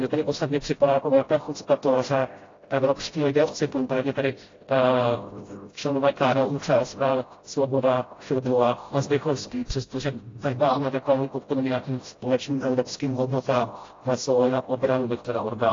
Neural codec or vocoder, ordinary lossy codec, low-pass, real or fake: codec, 16 kHz, 0.5 kbps, FreqCodec, smaller model; AAC, 32 kbps; 7.2 kHz; fake